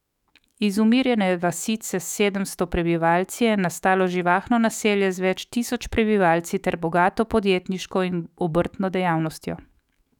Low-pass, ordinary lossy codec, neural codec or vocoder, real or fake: 19.8 kHz; none; autoencoder, 48 kHz, 128 numbers a frame, DAC-VAE, trained on Japanese speech; fake